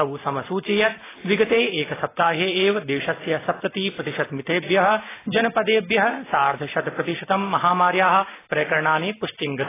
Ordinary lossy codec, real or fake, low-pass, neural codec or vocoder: AAC, 16 kbps; real; 3.6 kHz; none